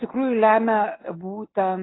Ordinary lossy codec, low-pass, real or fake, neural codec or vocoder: AAC, 16 kbps; 7.2 kHz; real; none